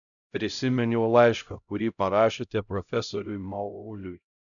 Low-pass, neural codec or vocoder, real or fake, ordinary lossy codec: 7.2 kHz; codec, 16 kHz, 0.5 kbps, X-Codec, HuBERT features, trained on LibriSpeech; fake; MP3, 96 kbps